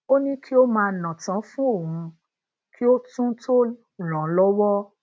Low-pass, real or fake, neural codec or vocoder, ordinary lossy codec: none; real; none; none